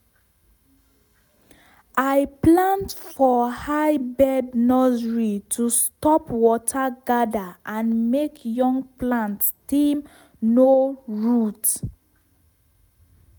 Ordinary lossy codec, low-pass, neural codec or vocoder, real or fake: none; none; none; real